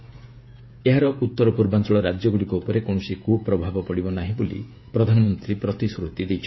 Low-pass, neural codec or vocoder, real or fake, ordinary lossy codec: 7.2 kHz; codec, 16 kHz, 16 kbps, FreqCodec, smaller model; fake; MP3, 24 kbps